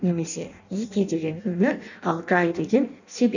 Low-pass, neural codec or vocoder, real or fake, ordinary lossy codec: 7.2 kHz; codec, 16 kHz in and 24 kHz out, 0.6 kbps, FireRedTTS-2 codec; fake; none